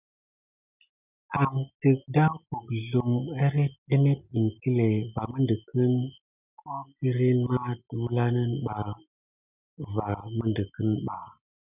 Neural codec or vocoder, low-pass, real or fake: none; 3.6 kHz; real